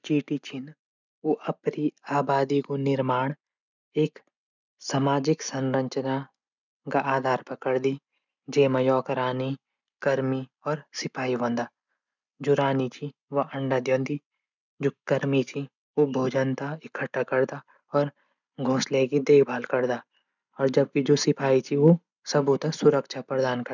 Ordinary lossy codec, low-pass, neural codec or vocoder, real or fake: none; 7.2 kHz; none; real